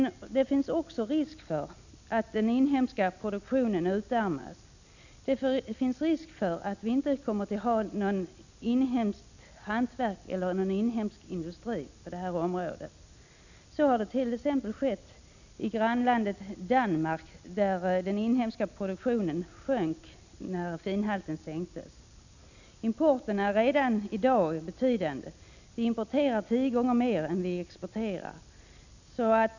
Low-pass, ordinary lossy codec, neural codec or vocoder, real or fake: 7.2 kHz; none; none; real